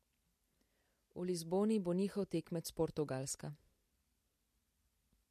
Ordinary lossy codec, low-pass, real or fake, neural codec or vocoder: MP3, 64 kbps; 14.4 kHz; real; none